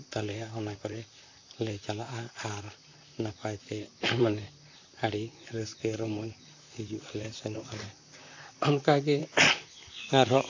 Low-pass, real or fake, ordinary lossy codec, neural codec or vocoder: 7.2 kHz; fake; AAC, 48 kbps; vocoder, 44.1 kHz, 128 mel bands, Pupu-Vocoder